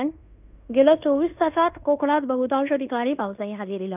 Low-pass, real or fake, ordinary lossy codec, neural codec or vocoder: 3.6 kHz; fake; none; codec, 16 kHz in and 24 kHz out, 0.9 kbps, LongCat-Audio-Codec, fine tuned four codebook decoder